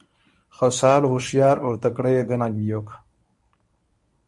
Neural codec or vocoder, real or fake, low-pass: codec, 24 kHz, 0.9 kbps, WavTokenizer, medium speech release version 1; fake; 10.8 kHz